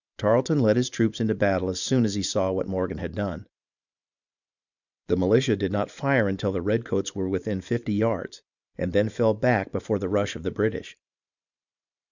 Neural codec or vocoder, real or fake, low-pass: vocoder, 44.1 kHz, 128 mel bands every 512 samples, BigVGAN v2; fake; 7.2 kHz